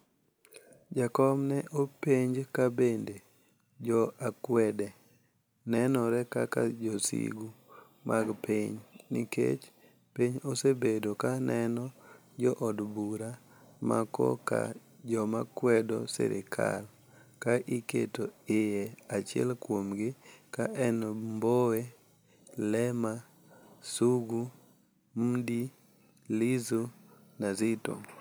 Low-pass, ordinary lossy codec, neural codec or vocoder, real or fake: none; none; none; real